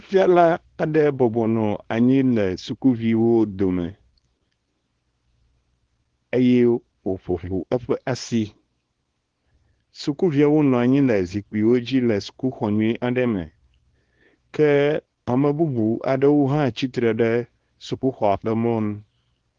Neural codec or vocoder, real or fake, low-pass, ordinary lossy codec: codec, 24 kHz, 0.9 kbps, WavTokenizer, small release; fake; 9.9 kHz; Opus, 16 kbps